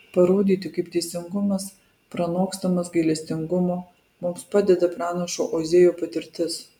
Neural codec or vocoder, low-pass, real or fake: none; 19.8 kHz; real